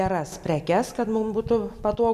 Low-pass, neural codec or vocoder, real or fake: 14.4 kHz; none; real